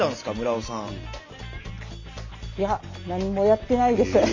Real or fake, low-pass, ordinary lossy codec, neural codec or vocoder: real; 7.2 kHz; MP3, 64 kbps; none